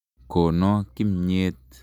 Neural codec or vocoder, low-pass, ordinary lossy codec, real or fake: none; 19.8 kHz; none; real